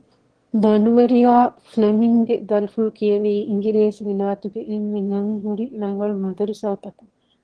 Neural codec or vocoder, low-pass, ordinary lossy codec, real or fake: autoencoder, 22.05 kHz, a latent of 192 numbers a frame, VITS, trained on one speaker; 9.9 kHz; Opus, 16 kbps; fake